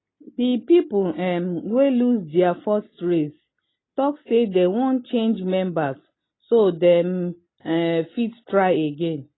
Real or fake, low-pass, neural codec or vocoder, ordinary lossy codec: real; 7.2 kHz; none; AAC, 16 kbps